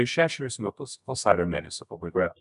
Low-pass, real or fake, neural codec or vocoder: 10.8 kHz; fake; codec, 24 kHz, 0.9 kbps, WavTokenizer, medium music audio release